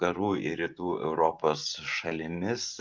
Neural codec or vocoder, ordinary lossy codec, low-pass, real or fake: vocoder, 44.1 kHz, 128 mel bands every 512 samples, BigVGAN v2; Opus, 24 kbps; 7.2 kHz; fake